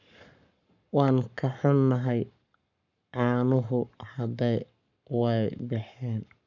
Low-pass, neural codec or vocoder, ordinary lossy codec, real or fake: 7.2 kHz; codec, 44.1 kHz, 7.8 kbps, Pupu-Codec; none; fake